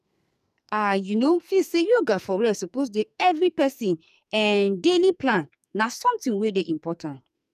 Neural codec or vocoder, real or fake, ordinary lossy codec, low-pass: codec, 44.1 kHz, 2.6 kbps, SNAC; fake; none; 14.4 kHz